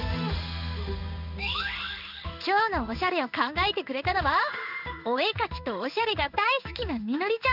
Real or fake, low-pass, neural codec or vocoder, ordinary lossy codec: fake; 5.4 kHz; codec, 16 kHz in and 24 kHz out, 1 kbps, XY-Tokenizer; none